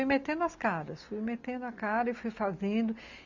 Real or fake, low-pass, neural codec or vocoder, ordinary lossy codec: real; 7.2 kHz; none; none